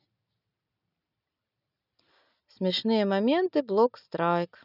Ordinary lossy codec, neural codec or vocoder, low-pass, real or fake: none; none; 5.4 kHz; real